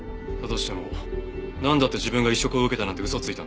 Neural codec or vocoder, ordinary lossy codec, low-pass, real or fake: none; none; none; real